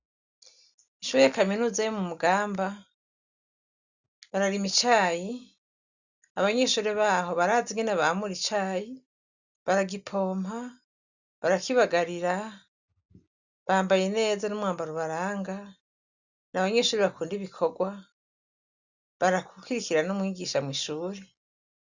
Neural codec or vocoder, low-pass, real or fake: none; 7.2 kHz; real